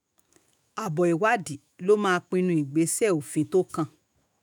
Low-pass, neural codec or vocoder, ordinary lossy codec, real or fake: none; autoencoder, 48 kHz, 128 numbers a frame, DAC-VAE, trained on Japanese speech; none; fake